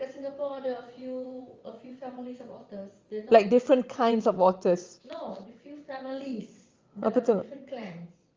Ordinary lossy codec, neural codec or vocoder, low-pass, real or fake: Opus, 32 kbps; vocoder, 22.05 kHz, 80 mel bands, WaveNeXt; 7.2 kHz; fake